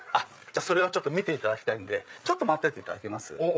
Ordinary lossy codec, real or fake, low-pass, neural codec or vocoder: none; fake; none; codec, 16 kHz, 8 kbps, FreqCodec, larger model